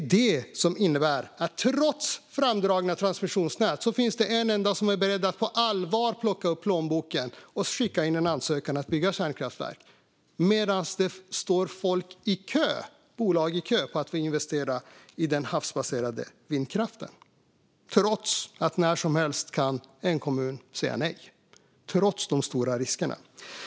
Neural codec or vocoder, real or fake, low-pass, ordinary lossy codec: none; real; none; none